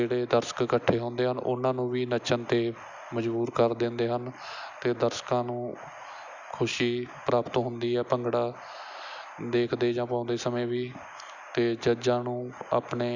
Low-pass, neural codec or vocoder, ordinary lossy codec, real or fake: 7.2 kHz; none; Opus, 64 kbps; real